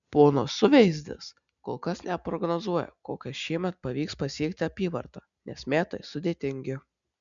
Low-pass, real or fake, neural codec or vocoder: 7.2 kHz; real; none